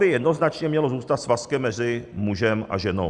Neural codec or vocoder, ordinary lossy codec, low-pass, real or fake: none; Opus, 64 kbps; 10.8 kHz; real